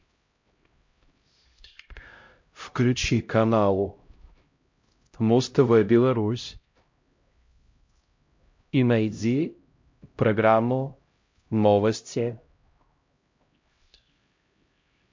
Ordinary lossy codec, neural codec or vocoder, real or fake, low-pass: MP3, 48 kbps; codec, 16 kHz, 0.5 kbps, X-Codec, HuBERT features, trained on LibriSpeech; fake; 7.2 kHz